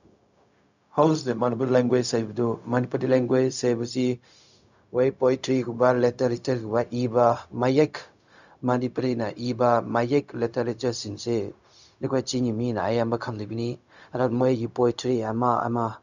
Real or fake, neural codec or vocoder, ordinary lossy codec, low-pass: fake; codec, 16 kHz, 0.4 kbps, LongCat-Audio-Codec; none; 7.2 kHz